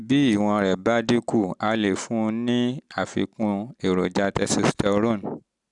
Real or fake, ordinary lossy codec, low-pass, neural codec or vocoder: real; none; 10.8 kHz; none